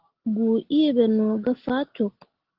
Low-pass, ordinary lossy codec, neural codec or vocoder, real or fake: 5.4 kHz; Opus, 16 kbps; none; real